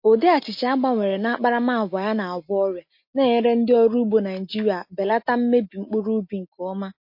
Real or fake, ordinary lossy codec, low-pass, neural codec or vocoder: real; MP3, 32 kbps; 5.4 kHz; none